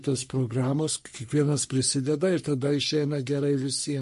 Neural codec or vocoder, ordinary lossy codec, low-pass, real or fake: codec, 44.1 kHz, 3.4 kbps, Pupu-Codec; MP3, 48 kbps; 14.4 kHz; fake